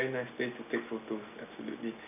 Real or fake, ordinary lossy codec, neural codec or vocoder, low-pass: real; none; none; 3.6 kHz